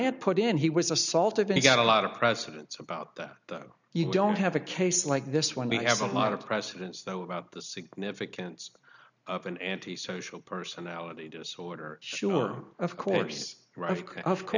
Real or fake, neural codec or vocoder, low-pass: real; none; 7.2 kHz